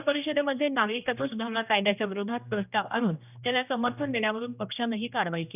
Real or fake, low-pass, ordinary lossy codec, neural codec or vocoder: fake; 3.6 kHz; none; codec, 16 kHz, 1 kbps, X-Codec, HuBERT features, trained on general audio